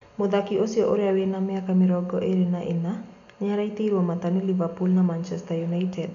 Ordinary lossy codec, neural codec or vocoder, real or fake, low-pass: none; none; real; 7.2 kHz